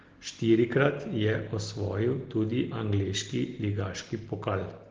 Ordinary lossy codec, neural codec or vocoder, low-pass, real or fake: Opus, 16 kbps; none; 7.2 kHz; real